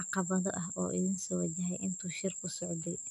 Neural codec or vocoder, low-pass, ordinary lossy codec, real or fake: none; 14.4 kHz; none; real